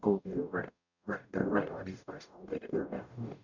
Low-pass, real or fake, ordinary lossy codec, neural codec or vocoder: 7.2 kHz; fake; none; codec, 44.1 kHz, 0.9 kbps, DAC